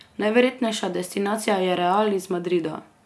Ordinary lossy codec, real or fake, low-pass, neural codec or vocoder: none; real; none; none